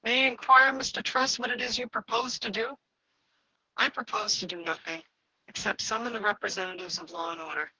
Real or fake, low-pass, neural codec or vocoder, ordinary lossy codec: fake; 7.2 kHz; codec, 44.1 kHz, 2.6 kbps, DAC; Opus, 16 kbps